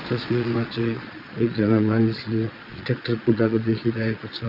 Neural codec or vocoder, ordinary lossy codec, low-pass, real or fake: vocoder, 22.05 kHz, 80 mel bands, Vocos; Opus, 64 kbps; 5.4 kHz; fake